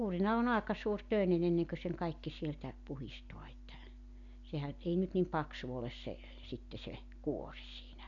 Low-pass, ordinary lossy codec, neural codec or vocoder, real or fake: 7.2 kHz; none; none; real